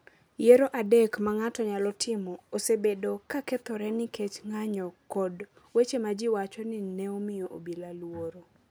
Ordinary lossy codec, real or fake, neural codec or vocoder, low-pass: none; real; none; none